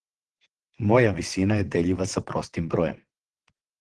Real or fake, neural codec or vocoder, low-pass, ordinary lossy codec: fake; vocoder, 22.05 kHz, 80 mel bands, WaveNeXt; 9.9 kHz; Opus, 16 kbps